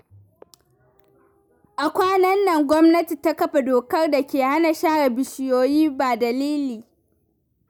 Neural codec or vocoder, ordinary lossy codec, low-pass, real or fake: none; none; none; real